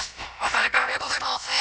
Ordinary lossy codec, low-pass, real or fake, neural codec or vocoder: none; none; fake; codec, 16 kHz, about 1 kbps, DyCAST, with the encoder's durations